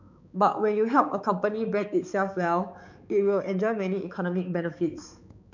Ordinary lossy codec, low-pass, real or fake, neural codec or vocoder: none; 7.2 kHz; fake; codec, 16 kHz, 4 kbps, X-Codec, HuBERT features, trained on balanced general audio